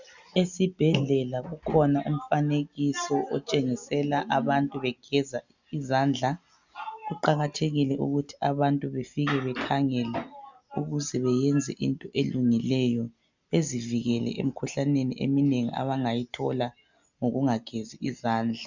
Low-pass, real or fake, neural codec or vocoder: 7.2 kHz; real; none